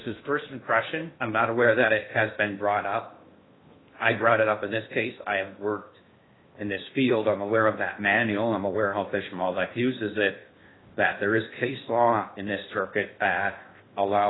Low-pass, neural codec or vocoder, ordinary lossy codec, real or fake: 7.2 kHz; codec, 16 kHz in and 24 kHz out, 0.6 kbps, FocalCodec, streaming, 2048 codes; AAC, 16 kbps; fake